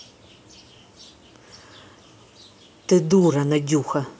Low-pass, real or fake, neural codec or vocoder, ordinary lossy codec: none; real; none; none